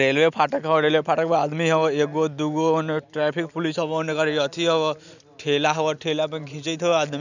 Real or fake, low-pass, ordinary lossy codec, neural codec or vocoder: real; 7.2 kHz; none; none